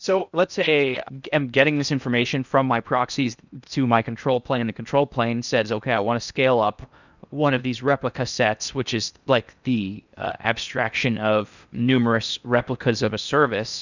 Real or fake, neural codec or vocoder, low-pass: fake; codec, 16 kHz in and 24 kHz out, 0.8 kbps, FocalCodec, streaming, 65536 codes; 7.2 kHz